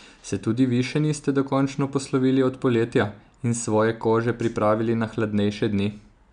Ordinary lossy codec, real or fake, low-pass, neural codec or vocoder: none; real; 9.9 kHz; none